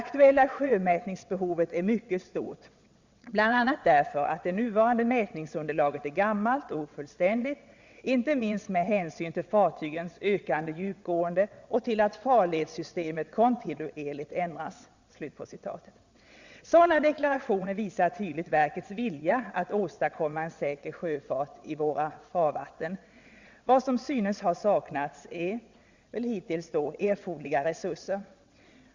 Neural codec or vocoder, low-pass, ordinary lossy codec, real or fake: vocoder, 22.05 kHz, 80 mel bands, WaveNeXt; 7.2 kHz; Opus, 64 kbps; fake